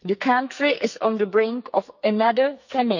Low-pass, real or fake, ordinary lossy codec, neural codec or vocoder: 7.2 kHz; fake; none; codec, 44.1 kHz, 2.6 kbps, SNAC